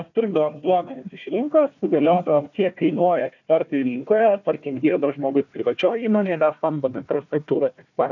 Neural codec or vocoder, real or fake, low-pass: codec, 16 kHz, 1 kbps, FunCodec, trained on Chinese and English, 50 frames a second; fake; 7.2 kHz